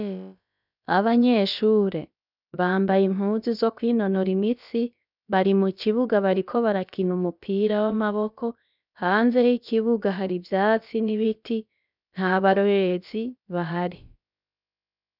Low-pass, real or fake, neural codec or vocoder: 5.4 kHz; fake; codec, 16 kHz, about 1 kbps, DyCAST, with the encoder's durations